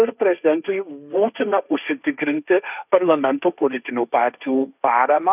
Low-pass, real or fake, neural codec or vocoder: 3.6 kHz; fake; codec, 16 kHz, 1.1 kbps, Voila-Tokenizer